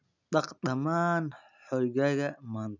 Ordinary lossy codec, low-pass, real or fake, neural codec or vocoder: none; 7.2 kHz; real; none